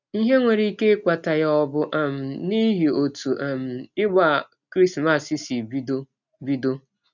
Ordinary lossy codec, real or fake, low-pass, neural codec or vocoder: none; real; 7.2 kHz; none